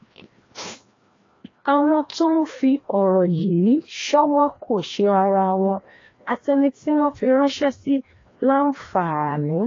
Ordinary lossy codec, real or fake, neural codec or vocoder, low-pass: AAC, 32 kbps; fake; codec, 16 kHz, 1 kbps, FreqCodec, larger model; 7.2 kHz